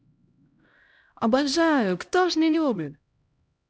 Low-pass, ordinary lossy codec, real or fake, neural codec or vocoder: none; none; fake; codec, 16 kHz, 0.5 kbps, X-Codec, HuBERT features, trained on LibriSpeech